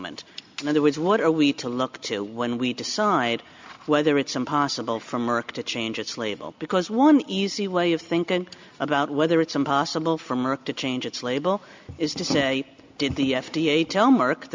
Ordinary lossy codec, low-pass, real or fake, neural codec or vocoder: MP3, 64 kbps; 7.2 kHz; real; none